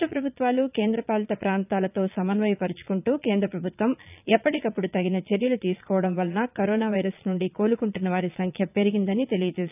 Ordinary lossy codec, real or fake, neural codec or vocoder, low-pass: none; fake; vocoder, 22.05 kHz, 80 mel bands, Vocos; 3.6 kHz